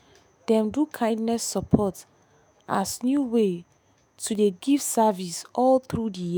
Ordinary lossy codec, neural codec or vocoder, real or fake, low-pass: none; autoencoder, 48 kHz, 128 numbers a frame, DAC-VAE, trained on Japanese speech; fake; none